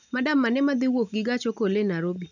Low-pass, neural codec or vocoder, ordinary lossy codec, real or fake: 7.2 kHz; none; none; real